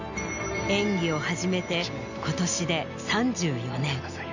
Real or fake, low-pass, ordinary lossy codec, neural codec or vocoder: real; 7.2 kHz; none; none